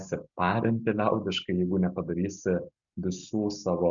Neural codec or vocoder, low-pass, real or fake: none; 7.2 kHz; real